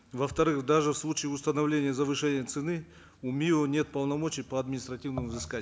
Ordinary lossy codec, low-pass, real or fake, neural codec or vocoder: none; none; real; none